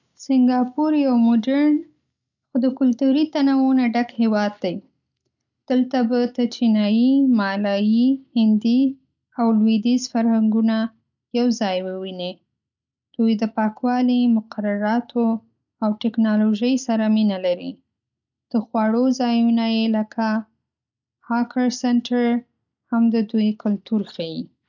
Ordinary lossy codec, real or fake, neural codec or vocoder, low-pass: none; real; none; 7.2 kHz